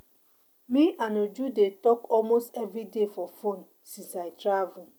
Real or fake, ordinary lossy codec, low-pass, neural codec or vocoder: real; none; none; none